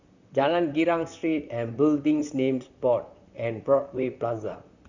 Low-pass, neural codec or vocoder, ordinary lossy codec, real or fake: 7.2 kHz; vocoder, 44.1 kHz, 128 mel bands, Pupu-Vocoder; Opus, 64 kbps; fake